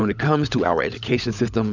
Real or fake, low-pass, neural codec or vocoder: fake; 7.2 kHz; codec, 16 kHz, 16 kbps, FunCodec, trained on LibriTTS, 50 frames a second